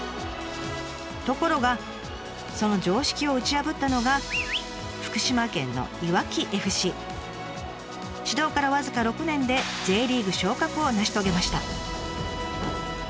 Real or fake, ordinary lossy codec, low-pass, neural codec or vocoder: real; none; none; none